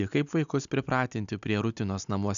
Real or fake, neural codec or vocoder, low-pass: real; none; 7.2 kHz